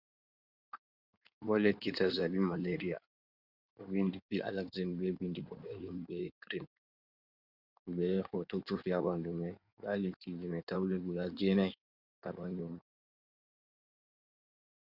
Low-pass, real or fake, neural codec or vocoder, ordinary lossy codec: 5.4 kHz; fake; codec, 16 kHz in and 24 kHz out, 2.2 kbps, FireRedTTS-2 codec; Opus, 64 kbps